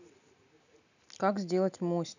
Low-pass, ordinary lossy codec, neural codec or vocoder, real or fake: 7.2 kHz; none; none; real